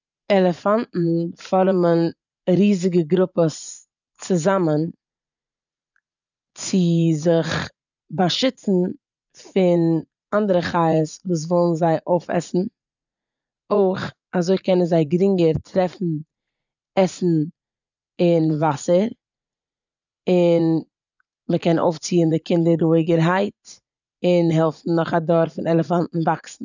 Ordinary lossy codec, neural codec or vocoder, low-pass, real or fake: none; vocoder, 44.1 kHz, 80 mel bands, Vocos; 7.2 kHz; fake